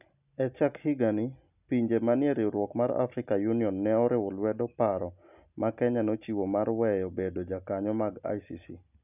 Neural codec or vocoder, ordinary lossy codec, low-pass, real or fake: none; none; 3.6 kHz; real